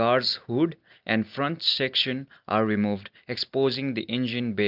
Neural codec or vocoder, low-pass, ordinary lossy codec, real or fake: none; 5.4 kHz; Opus, 24 kbps; real